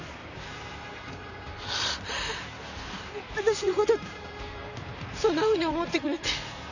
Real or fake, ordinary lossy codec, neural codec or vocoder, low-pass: fake; none; vocoder, 44.1 kHz, 128 mel bands, Pupu-Vocoder; 7.2 kHz